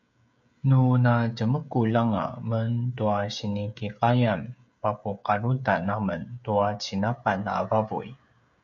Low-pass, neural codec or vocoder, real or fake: 7.2 kHz; codec, 16 kHz, 16 kbps, FreqCodec, smaller model; fake